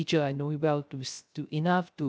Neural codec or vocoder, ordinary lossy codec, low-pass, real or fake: codec, 16 kHz, 0.3 kbps, FocalCodec; none; none; fake